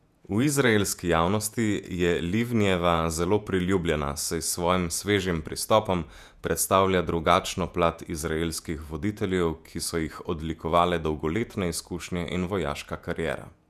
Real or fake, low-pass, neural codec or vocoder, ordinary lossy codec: fake; 14.4 kHz; vocoder, 48 kHz, 128 mel bands, Vocos; none